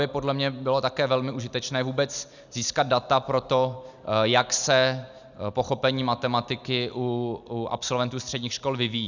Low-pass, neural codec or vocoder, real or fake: 7.2 kHz; none; real